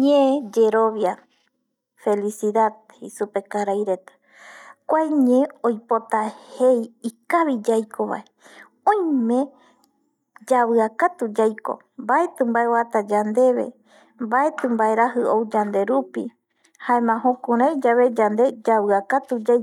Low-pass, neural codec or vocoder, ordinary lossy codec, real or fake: 19.8 kHz; none; none; real